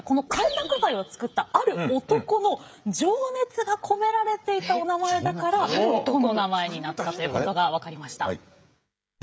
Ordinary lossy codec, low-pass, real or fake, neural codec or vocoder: none; none; fake; codec, 16 kHz, 16 kbps, FreqCodec, smaller model